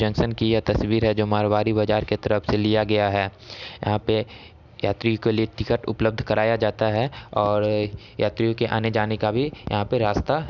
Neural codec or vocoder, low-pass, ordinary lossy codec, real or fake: none; 7.2 kHz; none; real